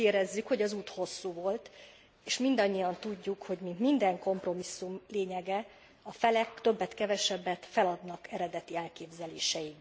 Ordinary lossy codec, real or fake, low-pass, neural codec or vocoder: none; real; none; none